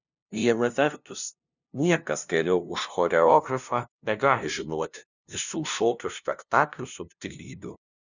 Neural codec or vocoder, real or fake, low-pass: codec, 16 kHz, 0.5 kbps, FunCodec, trained on LibriTTS, 25 frames a second; fake; 7.2 kHz